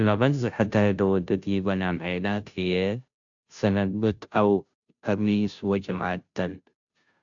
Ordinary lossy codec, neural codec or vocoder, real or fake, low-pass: AAC, 64 kbps; codec, 16 kHz, 0.5 kbps, FunCodec, trained on Chinese and English, 25 frames a second; fake; 7.2 kHz